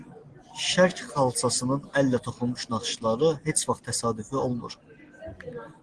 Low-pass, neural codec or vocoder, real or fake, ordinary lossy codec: 9.9 kHz; none; real; Opus, 16 kbps